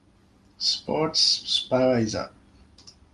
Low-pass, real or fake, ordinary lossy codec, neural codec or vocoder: 10.8 kHz; real; Opus, 32 kbps; none